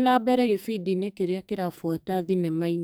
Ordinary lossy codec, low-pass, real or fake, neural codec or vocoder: none; none; fake; codec, 44.1 kHz, 2.6 kbps, SNAC